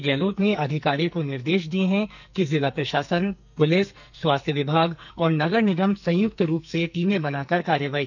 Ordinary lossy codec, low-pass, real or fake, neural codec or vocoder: none; 7.2 kHz; fake; codec, 44.1 kHz, 2.6 kbps, SNAC